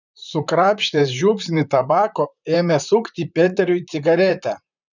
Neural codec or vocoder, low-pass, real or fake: vocoder, 44.1 kHz, 128 mel bands, Pupu-Vocoder; 7.2 kHz; fake